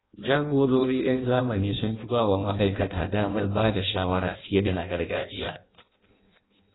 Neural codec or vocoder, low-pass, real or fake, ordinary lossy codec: codec, 16 kHz in and 24 kHz out, 0.6 kbps, FireRedTTS-2 codec; 7.2 kHz; fake; AAC, 16 kbps